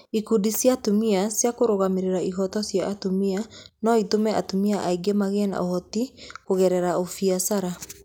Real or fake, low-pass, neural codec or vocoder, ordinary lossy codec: real; 19.8 kHz; none; none